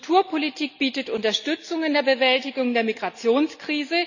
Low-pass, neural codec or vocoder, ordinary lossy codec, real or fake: 7.2 kHz; none; none; real